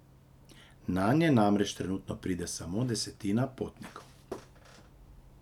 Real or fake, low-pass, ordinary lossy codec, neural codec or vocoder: real; 19.8 kHz; none; none